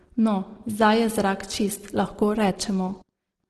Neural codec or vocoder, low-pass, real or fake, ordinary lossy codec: none; 10.8 kHz; real; Opus, 16 kbps